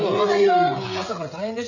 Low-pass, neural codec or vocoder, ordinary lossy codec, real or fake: 7.2 kHz; codec, 16 kHz, 16 kbps, FreqCodec, smaller model; none; fake